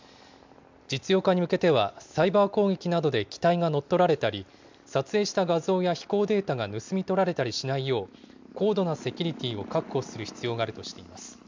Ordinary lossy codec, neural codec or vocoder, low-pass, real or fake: MP3, 64 kbps; none; 7.2 kHz; real